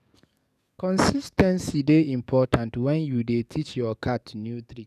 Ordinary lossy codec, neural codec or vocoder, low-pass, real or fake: none; codec, 44.1 kHz, 7.8 kbps, DAC; 14.4 kHz; fake